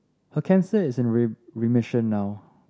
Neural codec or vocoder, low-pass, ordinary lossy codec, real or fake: none; none; none; real